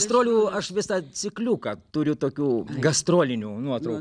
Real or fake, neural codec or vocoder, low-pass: real; none; 9.9 kHz